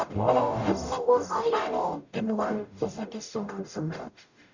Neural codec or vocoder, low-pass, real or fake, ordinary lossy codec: codec, 44.1 kHz, 0.9 kbps, DAC; 7.2 kHz; fake; none